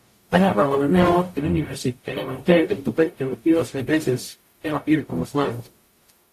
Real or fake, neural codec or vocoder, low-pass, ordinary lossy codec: fake; codec, 44.1 kHz, 0.9 kbps, DAC; 14.4 kHz; AAC, 64 kbps